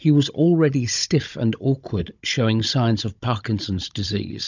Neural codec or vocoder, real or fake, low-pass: codec, 16 kHz, 16 kbps, FunCodec, trained on Chinese and English, 50 frames a second; fake; 7.2 kHz